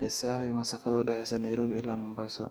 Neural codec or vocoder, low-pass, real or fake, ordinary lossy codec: codec, 44.1 kHz, 2.6 kbps, DAC; none; fake; none